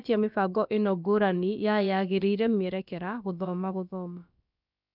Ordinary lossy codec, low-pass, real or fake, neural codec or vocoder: none; 5.4 kHz; fake; codec, 16 kHz, about 1 kbps, DyCAST, with the encoder's durations